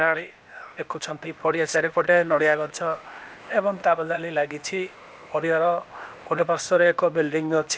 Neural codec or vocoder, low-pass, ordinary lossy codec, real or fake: codec, 16 kHz, 0.8 kbps, ZipCodec; none; none; fake